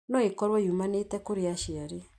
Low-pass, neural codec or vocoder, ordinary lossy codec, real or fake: none; none; none; real